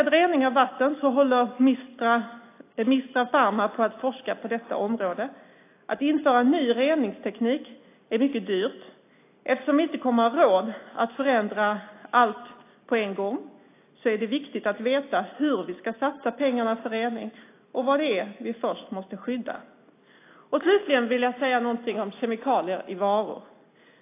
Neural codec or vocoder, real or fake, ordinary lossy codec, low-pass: none; real; AAC, 24 kbps; 3.6 kHz